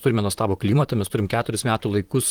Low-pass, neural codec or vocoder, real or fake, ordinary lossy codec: 19.8 kHz; vocoder, 44.1 kHz, 128 mel bands, Pupu-Vocoder; fake; Opus, 24 kbps